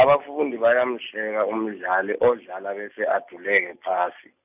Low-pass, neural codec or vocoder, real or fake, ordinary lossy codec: 3.6 kHz; none; real; none